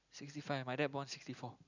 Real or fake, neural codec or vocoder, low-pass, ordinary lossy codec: real; none; 7.2 kHz; none